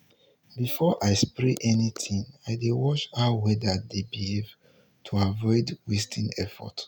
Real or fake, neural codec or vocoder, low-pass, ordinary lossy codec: real; none; 19.8 kHz; none